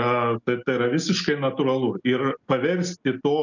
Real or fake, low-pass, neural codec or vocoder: real; 7.2 kHz; none